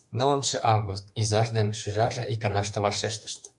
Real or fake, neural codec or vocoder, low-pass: fake; autoencoder, 48 kHz, 32 numbers a frame, DAC-VAE, trained on Japanese speech; 10.8 kHz